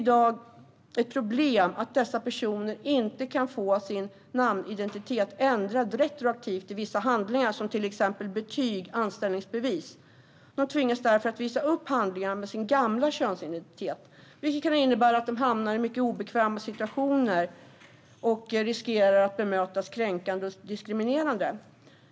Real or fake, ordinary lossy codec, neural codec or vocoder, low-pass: real; none; none; none